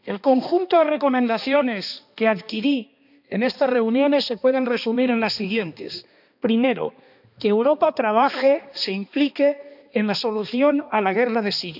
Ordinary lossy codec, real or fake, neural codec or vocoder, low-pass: none; fake; codec, 16 kHz, 2 kbps, X-Codec, HuBERT features, trained on balanced general audio; 5.4 kHz